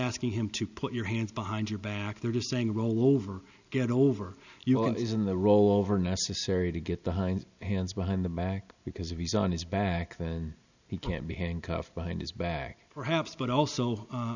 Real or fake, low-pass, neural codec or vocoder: real; 7.2 kHz; none